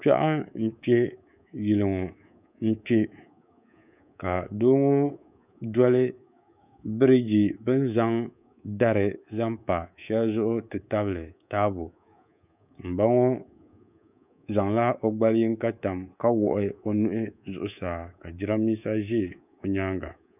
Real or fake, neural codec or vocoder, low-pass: fake; codec, 24 kHz, 3.1 kbps, DualCodec; 3.6 kHz